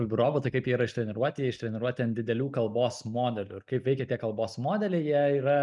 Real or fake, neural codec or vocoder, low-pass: real; none; 10.8 kHz